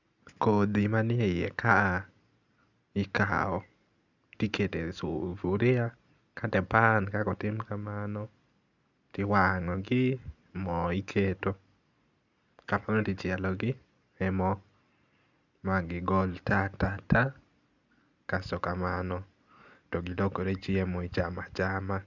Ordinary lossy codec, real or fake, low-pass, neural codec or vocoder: none; real; 7.2 kHz; none